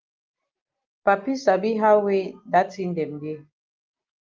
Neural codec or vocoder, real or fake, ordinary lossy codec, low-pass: none; real; Opus, 32 kbps; 7.2 kHz